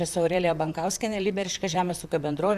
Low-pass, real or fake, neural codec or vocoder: 14.4 kHz; fake; vocoder, 44.1 kHz, 128 mel bands, Pupu-Vocoder